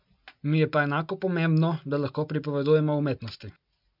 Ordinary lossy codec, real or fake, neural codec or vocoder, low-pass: none; real; none; 5.4 kHz